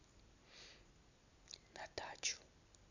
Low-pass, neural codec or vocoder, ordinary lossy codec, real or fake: 7.2 kHz; none; none; real